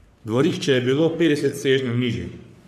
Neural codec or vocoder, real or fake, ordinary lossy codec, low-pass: codec, 44.1 kHz, 3.4 kbps, Pupu-Codec; fake; none; 14.4 kHz